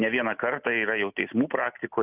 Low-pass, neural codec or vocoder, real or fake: 3.6 kHz; none; real